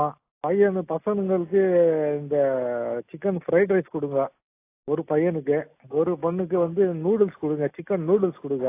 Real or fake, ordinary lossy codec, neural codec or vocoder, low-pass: real; AAC, 24 kbps; none; 3.6 kHz